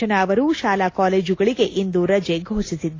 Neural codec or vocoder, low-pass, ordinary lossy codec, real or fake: none; 7.2 kHz; AAC, 32 kbps; real